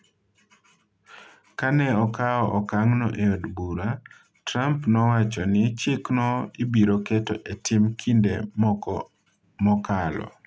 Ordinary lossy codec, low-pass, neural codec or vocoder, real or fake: none; none; none; real